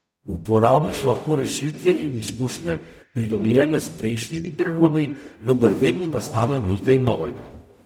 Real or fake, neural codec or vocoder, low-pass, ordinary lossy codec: fake; codec, 44.1 kHz, 0.9 kbps, DAC; 19.8 kHz; none